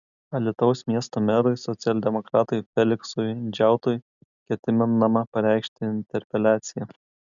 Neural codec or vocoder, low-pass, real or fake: none; 7.2 kHz; real